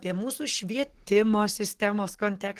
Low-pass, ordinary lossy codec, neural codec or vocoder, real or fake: 14.4 kHz; Opus, 16 kbps; codec, 44.1 kHz, 7.8 kbps, DAC; fake